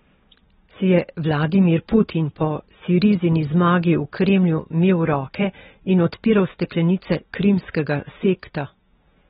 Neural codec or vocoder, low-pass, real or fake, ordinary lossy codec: none; 7.2 kHz; real; AAC, 16 kbps